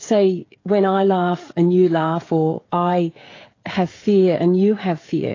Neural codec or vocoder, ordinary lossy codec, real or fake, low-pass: codec, 16 kHz, 16 kbps, FreqCodec, smaller model; AAC, 32 kbps; fake; 7.2 kHz